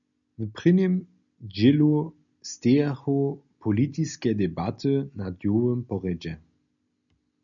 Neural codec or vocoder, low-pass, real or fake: none; 7.2 kHz; real